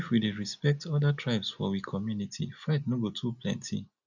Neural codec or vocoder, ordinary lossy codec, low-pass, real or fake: none; none; 7.2 kHz; real